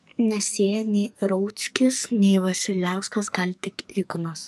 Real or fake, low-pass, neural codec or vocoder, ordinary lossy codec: fake; 14.4 kHz; codec, 44.1 kHz, 2.6 kbps, SNAC; MP3, 96 kbps